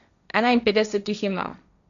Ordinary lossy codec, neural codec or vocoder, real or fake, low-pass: none; codec, 16 kHz, 1.1 kbps, Voila-Tokenizer; fake; 7.2 kHz